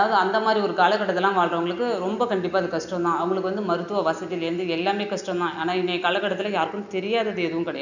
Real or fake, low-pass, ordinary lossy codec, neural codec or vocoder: real; 7.2 kHz; none; none